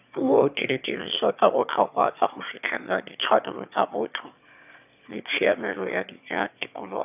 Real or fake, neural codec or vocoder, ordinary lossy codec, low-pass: fake; autoencoder, 22.05 kHz, a latent of 192 numbers a frame, VITS, trained on one speaker; none; 3.6 kHz